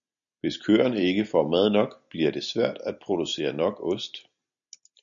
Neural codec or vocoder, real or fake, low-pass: none; real; 7.2 kHz